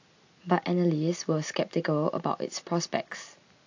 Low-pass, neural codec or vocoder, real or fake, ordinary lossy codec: 7.2 kHz; none; real; AAC, 48 kbps